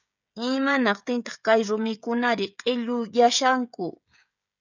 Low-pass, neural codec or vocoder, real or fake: 7.2 kHz; codec, 16 kHz, 16 kbps, FreqCodec, smaller model; fake